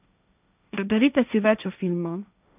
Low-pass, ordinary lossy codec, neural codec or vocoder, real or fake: 3.6 kHz; none; codec, 16 kHz, 1.1 kbps, Voila-Tokenizer; fake